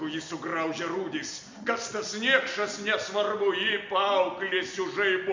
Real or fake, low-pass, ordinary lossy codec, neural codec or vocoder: real; 7.2 kHz; MP3, 64 kbps; none